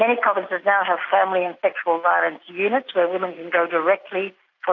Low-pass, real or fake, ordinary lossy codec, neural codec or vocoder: 7.2 kHz; real; AAC, 48 kbps; none